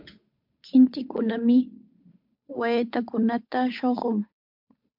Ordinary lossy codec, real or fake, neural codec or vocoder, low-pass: MP3, 48 kbps; fake; codec, 16 kHz, 8 kbps, FunCodec, trained on Chinese and English, 25 frames a second; 5.4 kHz